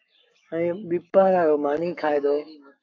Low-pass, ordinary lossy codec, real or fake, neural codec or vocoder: 7.2 kHz; AAC, 48 kbps; fake; codec, 44.1 kHz, 7.8 kbps, Pupu-Codec